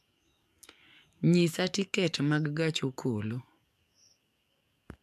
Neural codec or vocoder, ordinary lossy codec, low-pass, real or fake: codec, 44.1 kHz, 7.8 kbps, DAC; AAC, 96 kbps; 14.4 kHz; fake